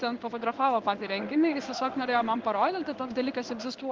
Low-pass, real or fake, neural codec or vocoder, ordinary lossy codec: 7.2 kHz; fake; codec, 16 kHz in and 24 kHz out, 1 kbps, XY-Tokenizer; Opus, 32 kbps